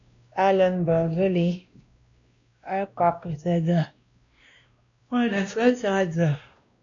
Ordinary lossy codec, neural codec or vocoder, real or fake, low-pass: AAC, 64 kbps; codec, 16 kHz, 1 kbps, X-Codec, WavLM features, trained on Multilingual LibriSpeech; fake; 7.2 kHz